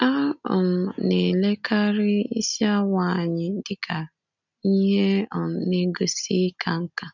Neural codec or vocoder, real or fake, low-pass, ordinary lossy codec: none; real; 7.2 kHz; none